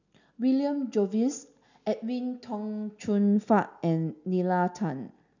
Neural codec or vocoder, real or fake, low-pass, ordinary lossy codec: none; real; 7.2 kHz; none